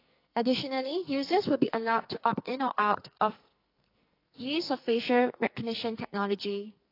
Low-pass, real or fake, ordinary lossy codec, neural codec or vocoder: 5.4 kHz; fake; AAC, 32 kbps; codec, 44.1 kHz, 2.6 kbps, SNAC